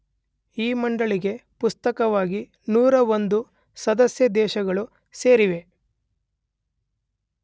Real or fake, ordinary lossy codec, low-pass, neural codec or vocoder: real; none; none; none